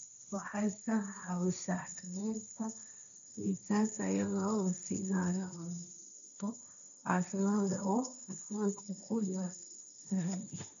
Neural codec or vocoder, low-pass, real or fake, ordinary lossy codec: codec, 16 kHz, 1.1 kbps, Voila-Tokenizer; 7.2 kHz; fake; none